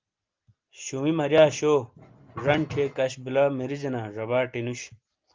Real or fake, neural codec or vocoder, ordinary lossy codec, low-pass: real; none; Opus, 32 kbps; 7.2 kHz